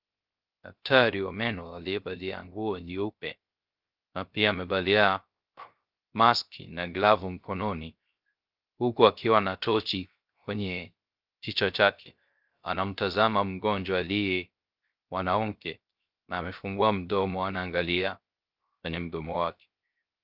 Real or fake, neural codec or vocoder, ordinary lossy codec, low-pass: fake; codec, 16 kHz, 0.3 kbps, FocalCodec; Opus, 32 kbps; 5.4 kHz